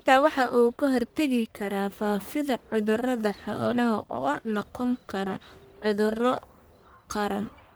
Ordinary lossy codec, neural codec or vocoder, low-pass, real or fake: none; codec, 44.1 kHz, 1.7 kbps, Pupu-Codec; none; fake